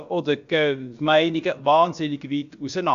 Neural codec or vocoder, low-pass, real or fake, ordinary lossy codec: codec, 16 kHz, about 1 kbps, DyCAST, with the encoder's durations; 7.2 kHz; fake; Opus, 64 kbps